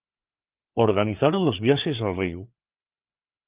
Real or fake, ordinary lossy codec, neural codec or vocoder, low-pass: fake; Opus, 24 kbps; codec, 16 kHz, 4 kbps, FreqCodec, larger model; 3.6 kHz